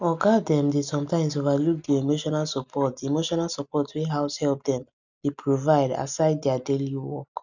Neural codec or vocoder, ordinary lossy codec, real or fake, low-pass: none; none; real; 7.2 kHz